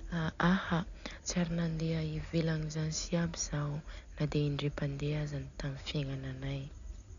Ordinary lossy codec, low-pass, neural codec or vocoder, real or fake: none; 7.2 kHz; none; real